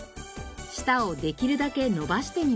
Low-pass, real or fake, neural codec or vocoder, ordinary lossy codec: none; real; none; none